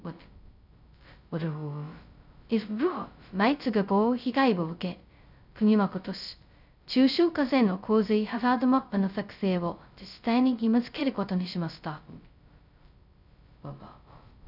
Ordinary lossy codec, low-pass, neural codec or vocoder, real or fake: none; 5.4 kHz; codec, 16 kHz, 0.2 kbps, FocalCodec; fake